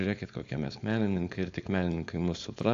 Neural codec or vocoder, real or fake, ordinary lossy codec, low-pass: codec, 16 kHz, 4.8 kbps, FACodec; fake; AAC, 64 kbps; 7.2 kHz